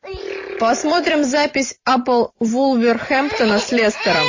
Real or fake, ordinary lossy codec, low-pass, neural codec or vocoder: fake; MP3, 32 kbps; 7.2 kHz; vocoder, 44.1 kHz, 128 mel bands every 256 samples, BigVGAN v2